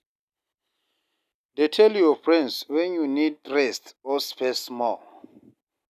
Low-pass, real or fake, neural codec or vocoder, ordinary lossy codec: 14.4 kHz; real; none; none